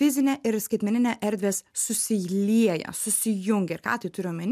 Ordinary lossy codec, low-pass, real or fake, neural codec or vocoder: MP3, 96 kbps; 14.4 kHz; real; none